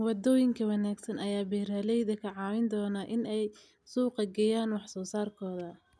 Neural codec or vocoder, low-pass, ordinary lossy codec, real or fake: none; none; none; real